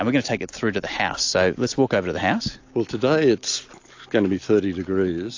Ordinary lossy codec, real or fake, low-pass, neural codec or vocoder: AAC, 48 kbps; real; 7.2 kHz; none